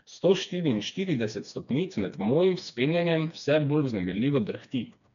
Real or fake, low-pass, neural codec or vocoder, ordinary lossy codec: fake; 7.2 kHz; codec, 16 kHz, 2 kbps, FreqCodec, smaller model; none